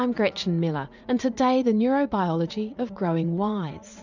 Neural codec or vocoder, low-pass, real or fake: vocoder, 44.1 kHz, 80 mel bands, Vocos; 7.2 kHz; fake